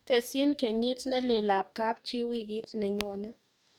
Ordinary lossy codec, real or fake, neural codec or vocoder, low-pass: none; fake; codec, 44.1 kHz, 2.6 kbps, DAC; 19.8 kHz